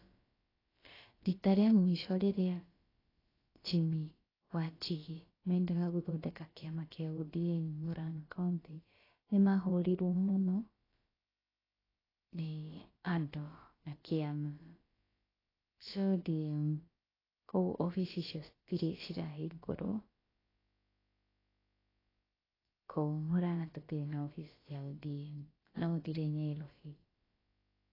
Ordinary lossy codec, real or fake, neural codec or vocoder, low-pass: AAC, 24 kbps; fake; codec, 16 kHz, about 1 kbps, DyCAST, with the encoder's durations; 5.4 kHz